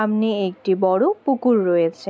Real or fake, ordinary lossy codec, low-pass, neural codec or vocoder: real; none; none; none